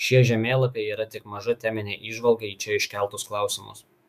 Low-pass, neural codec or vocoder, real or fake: 14.4 kHz; autoencoder, 48 kHz, 128 numbers a frame, DAC-VAE, trained on Japanese speech; fake